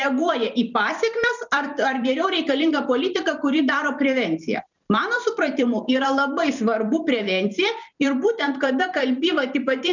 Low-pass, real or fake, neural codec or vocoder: 7.2 kHz; fake; vocoder, 44.1 kHz, 128 mel bands every 256 samples, BigVGAN v2